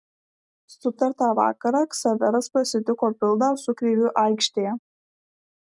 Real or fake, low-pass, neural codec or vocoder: real; 10.8 kHz; none